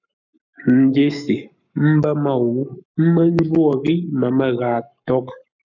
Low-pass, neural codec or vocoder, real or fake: 7.2 kHz; codec, 44.1 kHz, 7.8 kbps, Pupu-Codec; fake